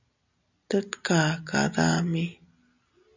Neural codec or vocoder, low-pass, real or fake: none; 7.2 kHz; real